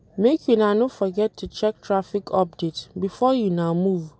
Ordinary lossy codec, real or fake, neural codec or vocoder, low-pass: none; real; none; none